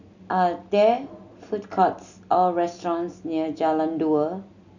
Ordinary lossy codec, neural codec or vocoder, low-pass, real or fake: none; none; 7.2 kHz; real